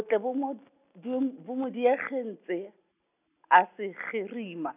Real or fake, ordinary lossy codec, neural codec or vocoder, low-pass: real; none; none; 3.6 kHz